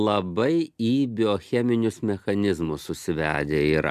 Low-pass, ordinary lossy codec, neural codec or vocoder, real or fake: 14.4 kHz; MP3, 96 kbps; none; real